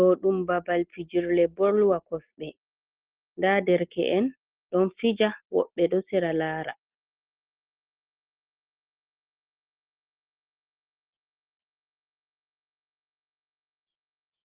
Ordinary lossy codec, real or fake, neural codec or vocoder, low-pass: Opus, 16 kbps; real; none; 3.6 kHz